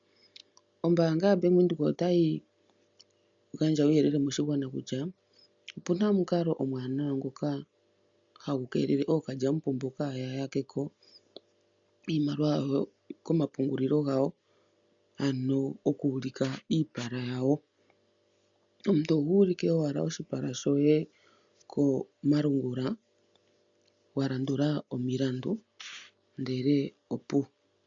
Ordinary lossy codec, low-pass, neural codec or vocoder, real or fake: MP3, 64 kbps; 7.2 kHz; none; real